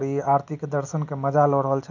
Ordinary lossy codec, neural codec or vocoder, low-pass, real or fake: none; none; 7.2 kHz; real